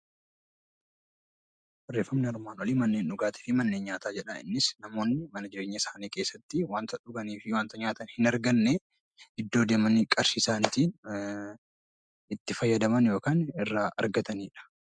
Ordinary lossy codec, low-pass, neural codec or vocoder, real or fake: MP3, 96 kbps; 9.9 kHz; none; real